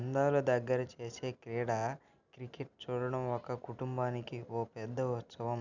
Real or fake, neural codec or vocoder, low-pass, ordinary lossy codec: real; none; 7.2 kHz; none